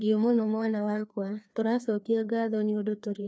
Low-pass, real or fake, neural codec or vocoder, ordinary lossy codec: none; fake; codec, 16 kHz, 2 kbps, FreqCodec, larger model; none